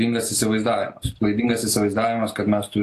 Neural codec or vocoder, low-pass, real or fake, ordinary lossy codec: none; 14.4 kHz; real; AAC, 48 kbps